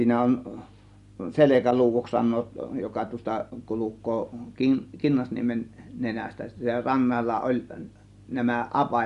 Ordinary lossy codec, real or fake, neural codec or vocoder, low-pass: Opus, 64 kbps; real; none; 10.8 kHz